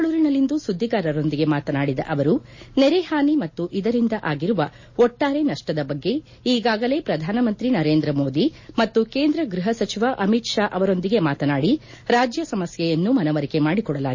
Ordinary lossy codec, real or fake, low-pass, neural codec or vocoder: MP3, 32 kbps; real; 7.2 kHz; none